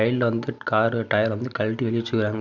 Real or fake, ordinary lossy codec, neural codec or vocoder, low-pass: real; none; none; 7.2 kHz